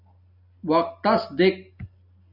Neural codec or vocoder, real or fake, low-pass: none; real; 5.4 kHz